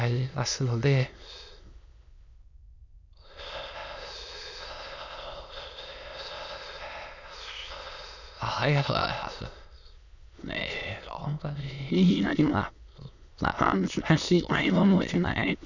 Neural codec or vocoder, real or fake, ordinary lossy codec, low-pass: autoencoder, 22.05 kHz, a latent of 192 numbers a frame, VITS, trained on many speakers; fake; none; 7.2 kHz